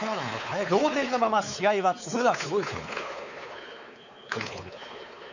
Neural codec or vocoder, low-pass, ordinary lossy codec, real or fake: codec, 16 kHz, 4 kbps, X-Codec, WavLM features, trained on Multilingual LibriSpeech; 7.2 kHz; none; fake